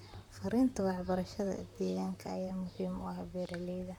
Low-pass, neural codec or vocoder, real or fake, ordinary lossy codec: 19.8 kHz; vocoder, 44.1 kHz, 128 mel bands, Pupu-Vocoder; fake; none